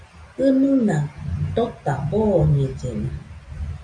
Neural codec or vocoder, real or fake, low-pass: none; real; 9.9 kHz